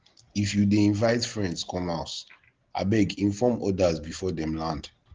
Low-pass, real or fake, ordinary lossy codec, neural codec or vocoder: 7.2 kHz; real; Opus, 16 kbps; none